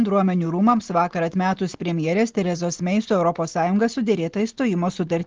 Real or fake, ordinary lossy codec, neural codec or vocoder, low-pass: real; Opus, 16 kbps; none; 7.2 kHz